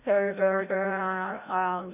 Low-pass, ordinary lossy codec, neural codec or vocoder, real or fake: 3.6 kHz; none; codec, 16 kHz, 0.5 kbps, FreqCodec, larger model; fake